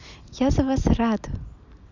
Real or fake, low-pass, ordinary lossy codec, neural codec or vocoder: real; 7.2 kHz; none; none